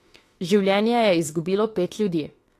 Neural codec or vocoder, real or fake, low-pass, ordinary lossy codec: autoencoder, 48 kHz, 32 numbers a frame, DAC-VAE, trained on Japanese speech; fake; 14.4 kHz; AAC, 48 kbps